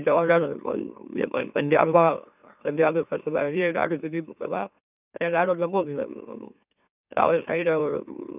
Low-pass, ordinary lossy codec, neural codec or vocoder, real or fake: 3.6 kHz; none; autoencoder, 44.1 kHz, a latent of 192 numbers a frame, MeloTTS; fake